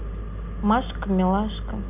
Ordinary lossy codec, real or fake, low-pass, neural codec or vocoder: none; real; 3.6 kHz; none